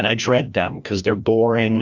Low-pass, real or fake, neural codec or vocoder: 7.2 kHz; fake; codec, 16 kHz, 1 kbps, FreqCodec, larger model